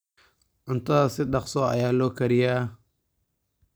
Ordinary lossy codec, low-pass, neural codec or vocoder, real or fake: none; none; none; real